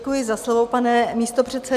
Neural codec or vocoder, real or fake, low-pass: none; real; 14.4 kHz